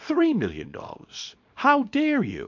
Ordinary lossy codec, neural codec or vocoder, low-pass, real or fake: MP3, 48 kbps; codec, 24 kHz, 0.9 kbps, WavTokenizer, small release; 7.2 kHz; fake